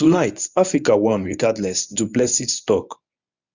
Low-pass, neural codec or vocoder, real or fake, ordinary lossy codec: 7.2 kHz; codec, 24 kHz, 0.9 kbps, WavTokenizer, medium speech release version 1; fake; none